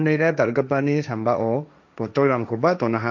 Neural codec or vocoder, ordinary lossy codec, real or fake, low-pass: codec, 16 kHz, 1.1 kbps, Voila-Tokenizer; none; fake; none